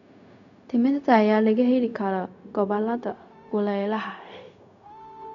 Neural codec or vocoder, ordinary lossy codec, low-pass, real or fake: codec, 16 kHz, 0.4 kbps, LongCat-Audio-Codec; none; 7.2 kHz; fake